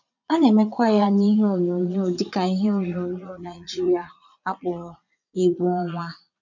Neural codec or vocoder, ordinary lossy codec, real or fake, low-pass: vocoder, 22.05 kHz, 80 mel bands, Vocos; none; fake; 7.2 kHz